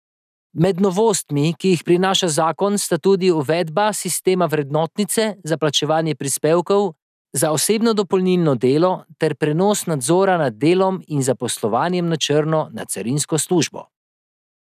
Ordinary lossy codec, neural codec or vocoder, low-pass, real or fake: none; none; 14.4 kHz; real